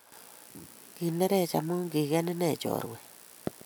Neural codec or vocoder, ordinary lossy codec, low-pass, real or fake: none; none; none; real